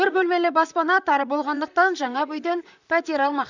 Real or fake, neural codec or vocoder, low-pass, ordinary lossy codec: fake; vocoder, 44.1 kHz, 128 mel bands, Pupu-Vocoder; 7.2 kHz; none